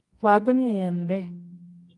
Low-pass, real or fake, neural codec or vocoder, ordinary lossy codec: 10.8 kHz; fake; codec, 24 kHz, 0.9 kbps, WavTokenizer, medium music audio release; Opus, 32 kbps